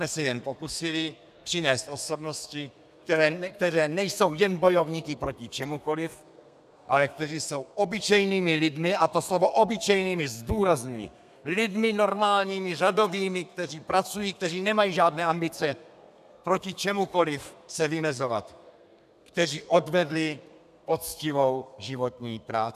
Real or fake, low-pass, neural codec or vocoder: fake; 14.4 kHz; codec, 32 kHz, 1.9 kbps, SNAC